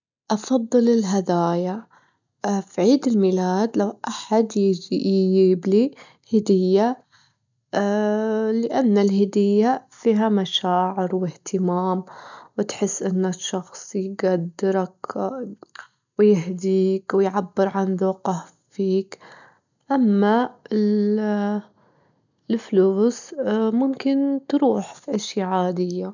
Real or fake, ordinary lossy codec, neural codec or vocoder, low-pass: real; none; none; 7.2 kHz